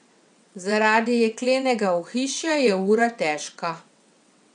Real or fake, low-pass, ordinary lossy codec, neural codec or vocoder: fake; 9.9 kHz; none; vocoder, 22.05 kHz, 80 mel bands, WaveNeXt